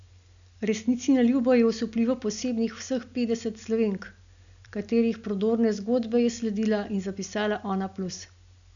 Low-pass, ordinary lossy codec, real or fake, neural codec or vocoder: 7.2 kHz; none; real; none